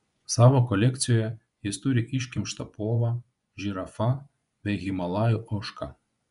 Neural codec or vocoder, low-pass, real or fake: vocoder, 24 kHz, 100 mel bands, Vocos; 10.8 kHz; fake